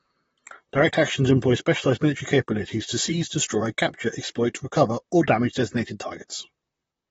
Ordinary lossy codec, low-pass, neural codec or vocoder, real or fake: AAC, 24 kbps; 19.8 kHz; vocoder, 44.1 kHz, 128 mel bands, Pupu-Vocoder; fake